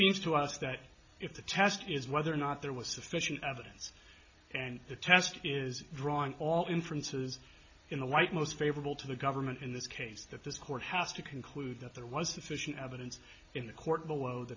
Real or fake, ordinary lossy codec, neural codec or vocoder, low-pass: real; AAC, 48 kbps; none; 7.2 kHz